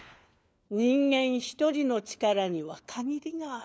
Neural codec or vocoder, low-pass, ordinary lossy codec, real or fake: codec, 16 kHz, 4 kbps, FunCodec, trained on LibriTTS, 50 frames a second; none; none; fake